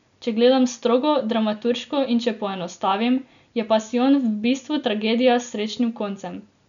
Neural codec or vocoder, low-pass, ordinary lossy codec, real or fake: none; 7.2 kHz; none; real